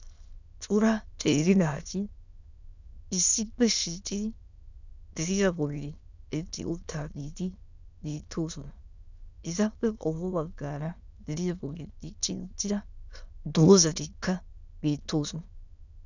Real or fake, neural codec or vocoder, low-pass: fake; autoencoder, 22.05 kHz, a latent of 192 numbers a frame, VITS, trained on many speakers; 7.2 kHz